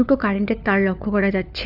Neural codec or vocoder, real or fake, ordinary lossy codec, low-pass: codec, 16 kHz, 4 kbps, FunCodec, trained on LibriTTS, 50 frames a second; fake; none; 5.4 kHz